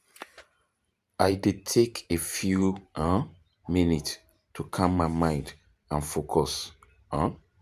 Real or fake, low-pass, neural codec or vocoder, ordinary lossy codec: fake; 14.4 kHz; vocoder, 44.1 kHz, 128 mel bands every 512 samples, BigVGAN v2; none